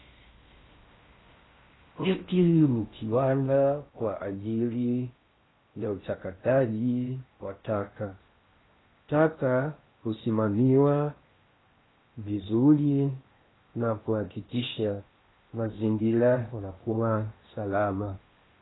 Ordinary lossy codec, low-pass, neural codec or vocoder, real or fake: AAC, 16 kbps; 7.2 kHz; codec, 16 kHz in and 24 kHz out, 0.6 kbps, FocalCodec, streaming, 4096 codes; fake